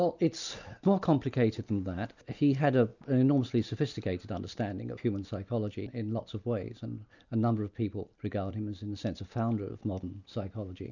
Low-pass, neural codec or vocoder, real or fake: 7.2 kHz; none; real